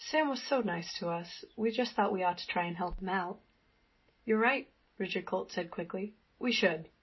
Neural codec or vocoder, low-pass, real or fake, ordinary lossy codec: none; 7.2 kHz; real; MP3, 24 kbps